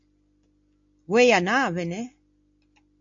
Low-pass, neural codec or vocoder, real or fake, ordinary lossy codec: 7.2 kHz; none; real; MP3, 48 kbps